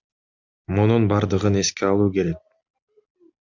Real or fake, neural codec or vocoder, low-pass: real; none; 7.2 kHz